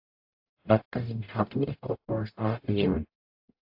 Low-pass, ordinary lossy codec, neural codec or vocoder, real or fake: 5.4 kHz; AAC, 48 kbps; codec, 44.1 kHz, 0.9 kbps, DAC; fake